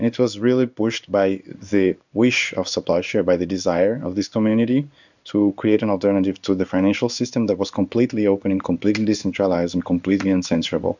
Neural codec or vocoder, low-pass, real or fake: codec, 16 kHz in and 24 kHz out, 1 kbps, XY-Tokenizer; 7.2 kHz; fake